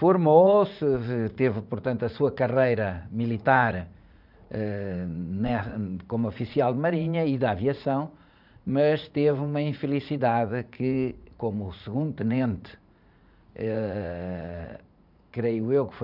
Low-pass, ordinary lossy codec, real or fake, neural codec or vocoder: 5.4 kHz; none; fake; vocoder, 44.1 kHz, 80 mel bands, Vocos